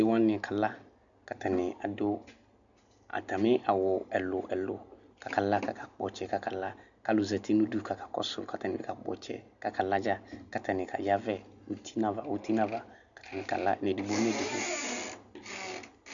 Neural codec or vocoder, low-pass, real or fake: none; 7.2 kHz; real